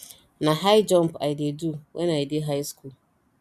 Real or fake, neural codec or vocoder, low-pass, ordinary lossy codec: real; none; 14.4 kHz; none